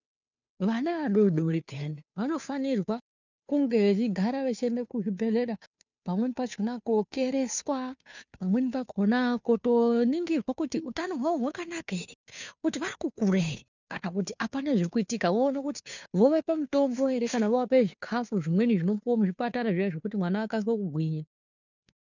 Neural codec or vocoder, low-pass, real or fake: codec, 16 kHz, 2 kbps, FunCodec, trained on Chinese and English, 25 frames a second; 7.2 kHz; fake